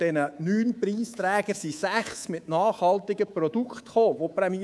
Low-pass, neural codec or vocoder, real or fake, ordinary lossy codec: none; codec, 24 kHz, 3.1 kbps, DualCodec; fake; none